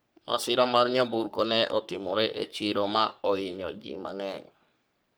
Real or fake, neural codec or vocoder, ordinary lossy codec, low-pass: fake; codec, 44.1 kHz, 3.4 kbps, Pupu-Codec; none; none